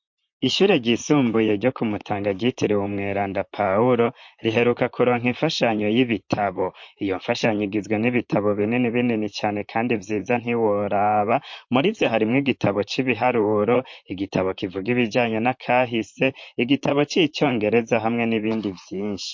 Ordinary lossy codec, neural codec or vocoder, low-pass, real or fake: MP3, 48 kbps; vocoder, 44.1 kHz, 128 mel bands, Pupu-Vocoder; 7.2 kHz; fake